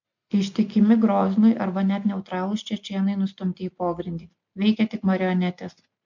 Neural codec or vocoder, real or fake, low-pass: none; real; 7.2 kHz